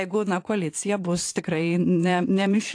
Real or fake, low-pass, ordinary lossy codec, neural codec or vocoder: fake; 9.9 kHz; AAC, 48 kbps; vocoder, 22.05 kHz, 80 mel bands, Vocos